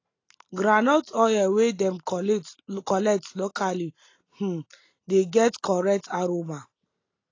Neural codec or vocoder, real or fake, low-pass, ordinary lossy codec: none; real; 7.2 kHz; AAC, 32 kbps